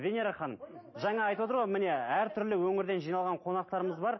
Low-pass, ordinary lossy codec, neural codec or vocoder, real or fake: 7.2 kHz; MP3, 24 kbps; none; real